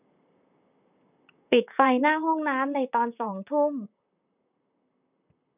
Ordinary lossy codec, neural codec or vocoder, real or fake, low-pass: none; vocoder, 44.1 kHz, 128 mel bands, Pupu-Vocoder; fake; 3.6 kHz